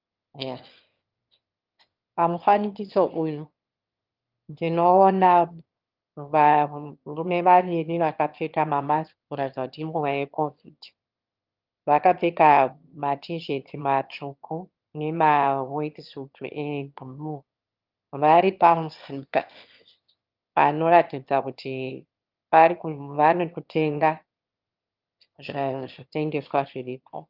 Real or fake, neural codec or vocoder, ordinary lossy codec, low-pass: fake; autoencoder, 22.05 kHz, a latent of 192 numbers a frame, VITS, trained on one speaker; Opus, 24 kbps; 5.4 kHz